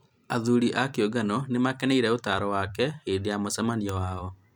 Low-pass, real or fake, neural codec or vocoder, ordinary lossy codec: 19.8 kHz; fake; vocoder, 44.1 kHz, 128 mel bands every 256 samples, BigVGAN v2; none